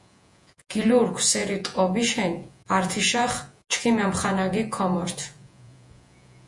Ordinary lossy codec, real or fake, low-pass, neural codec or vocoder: MP3, 48 kbps; fake; 10.8 kHz; vocoder, 48 kHz, 128 mel bands, Vocos